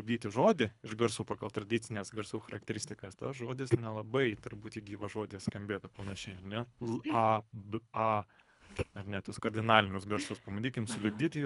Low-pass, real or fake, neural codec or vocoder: 10.8 kHz; fake; codec, 24 kHz, 3 kbps, HILCodec